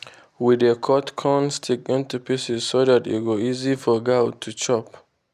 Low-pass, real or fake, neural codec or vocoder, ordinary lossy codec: 14.4 kHz; real; none; none